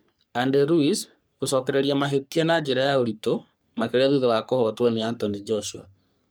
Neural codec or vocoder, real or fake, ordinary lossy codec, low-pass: codec, 44.1 kHz, 3.4 kbps, Pupu-Codec; fake; none; none